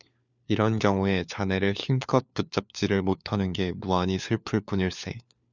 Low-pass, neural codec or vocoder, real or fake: 7.2 kHz; codec, 16 kHz, 4 kbps, FunCodec, trained on LibriTTS, 50 frames a second; fake